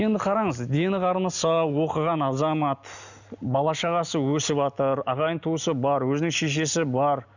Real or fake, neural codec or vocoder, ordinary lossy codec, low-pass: real; none; none; 7.2 kHz